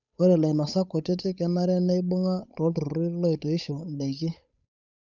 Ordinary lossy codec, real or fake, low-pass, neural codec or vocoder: none; fake; 7.2 kHz; codec, 16 kHz, 8 kbps, FunCodec, trained on Chinese and English, 25 frames a second